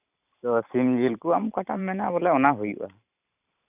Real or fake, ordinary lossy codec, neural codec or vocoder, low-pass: fake; none; vocoder, 44.1 kHz, 128 mel bands every 512 samples, BigVGAN v2; 3.6 kHz